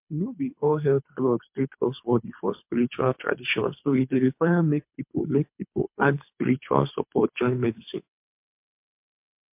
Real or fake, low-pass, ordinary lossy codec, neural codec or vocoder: fake; 3.6 kHz; MP3, 32 kbps; codec, 24 kHz, 3 kbps, HILCodec